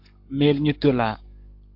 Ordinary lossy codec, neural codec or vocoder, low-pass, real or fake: MP3, 48 kbps; codec, 44.1 kHz, 7.8 kbps, Pupu-Codec; 5.4 kHz; fake